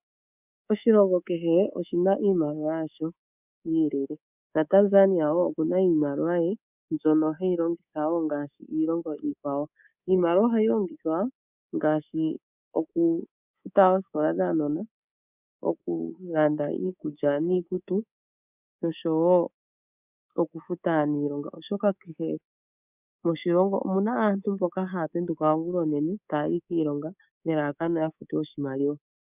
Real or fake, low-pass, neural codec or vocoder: fake; 3.6 kHz; codec, 24 kHz, 3.1 kbps, DualCodec